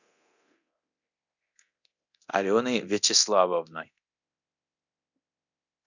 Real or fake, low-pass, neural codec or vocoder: fake; 7.2 kHz; codec, 24 kHz, 0.9 kbps, DualCodec